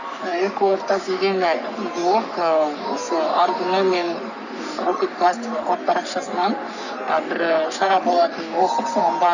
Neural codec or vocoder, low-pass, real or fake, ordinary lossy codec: codec, 44.1 kHz, 3.4 kbps, Pupu-Codec; 7.2 kHz; fake; none